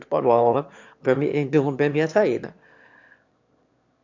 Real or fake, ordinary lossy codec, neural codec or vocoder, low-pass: fake; MP3, 64 kbps; autoencoder, 22.05 kHz, a latent of 192 numbers a frame, VITS, trained on one speaker; 7.2 kHz